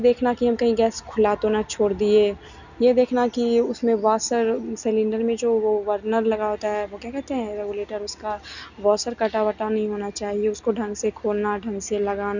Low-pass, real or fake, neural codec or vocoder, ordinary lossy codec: 7.2 kHz; real; none; none